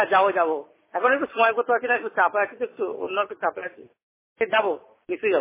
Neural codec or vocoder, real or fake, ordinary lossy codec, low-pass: none; real; MP3, 16 kbps; 3.6 kHz